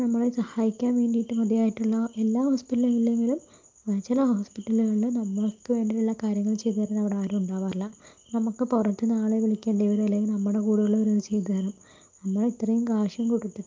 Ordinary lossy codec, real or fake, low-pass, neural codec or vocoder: Opus, 24 kbps; real; 7.2 kHz; none